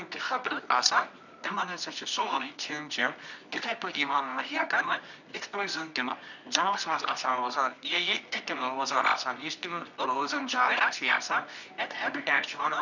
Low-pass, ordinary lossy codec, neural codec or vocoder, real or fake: 7.2 kHz; none; codec, 24 kHz, 0.9 kbps, WavTokenizer, medium music audio release; fake